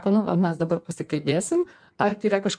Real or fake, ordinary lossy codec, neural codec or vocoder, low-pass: fake; MP3, 96 kbps; codec, 16 kHz in and 24 kHz out, 1.1 kbps, FireRedTTS-2 codec; 9.9 kHz